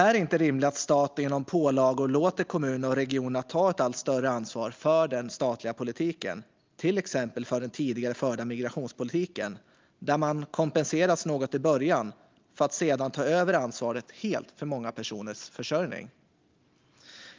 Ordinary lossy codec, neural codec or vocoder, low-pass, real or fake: Opus, 24 kbps; none; 7.2 kHz; real